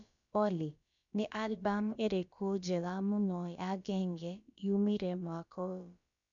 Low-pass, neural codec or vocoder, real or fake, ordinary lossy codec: 7.2 kHz; codec, 16 kHz, about 1 kbps, DyCAST, with the encoder's durations; fake; none